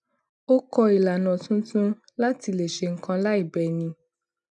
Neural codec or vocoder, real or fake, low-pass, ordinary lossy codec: none; real; 10.8 kHz; none